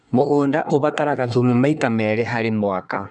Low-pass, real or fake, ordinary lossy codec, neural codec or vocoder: 10.8 kHz; fake; none; codec, 24 kHz, 1 kbps, SNAC